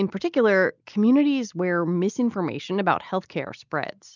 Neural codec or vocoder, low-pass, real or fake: none; 7.2 kHz; real